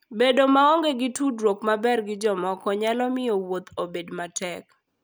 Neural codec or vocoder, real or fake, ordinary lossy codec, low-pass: none; real; none; none